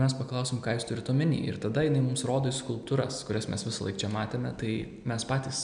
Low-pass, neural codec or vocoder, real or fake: 9.9 kHz; none; real